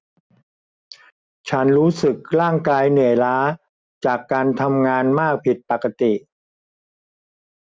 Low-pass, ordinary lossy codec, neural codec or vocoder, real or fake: none; none; none; real